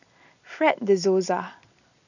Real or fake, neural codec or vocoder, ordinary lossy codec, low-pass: real; none; none; 7.2 kHz